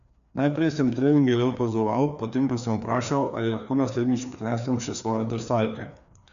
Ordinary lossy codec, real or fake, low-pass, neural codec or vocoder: none; fake; 7.2 kHz; codec, 16 kHz, 2 kbps, FreqCodec, larger model